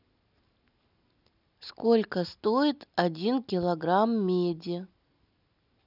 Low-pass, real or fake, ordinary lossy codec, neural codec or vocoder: 5.4 kHz; real; none; none